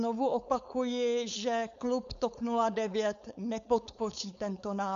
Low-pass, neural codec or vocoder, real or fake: 7.2 kHz; codec, 16 kHz, 4.8 kbps, FACodec; fake